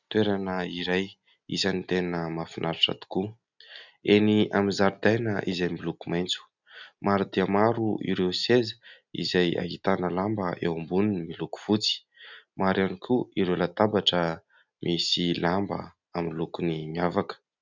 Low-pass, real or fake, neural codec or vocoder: 7.2 kHz; real; none